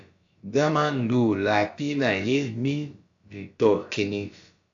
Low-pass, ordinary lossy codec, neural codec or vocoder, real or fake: 7.2 kHz; AAC, 64 kbps; codec, 16 kHz, about 1 kbps, DyCAST, with the encoder's durations; fake